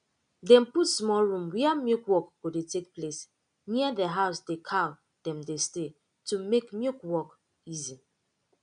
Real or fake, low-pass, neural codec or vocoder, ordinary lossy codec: real; 9.9 kHz; none; none